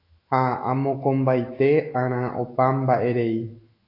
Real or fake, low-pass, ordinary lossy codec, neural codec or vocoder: fake; 5.4 kHz; AAC, 32 kbps; autoencoder, 48 kHz, 128 numbers a frame, DAC-VAE, trained on Japanese speech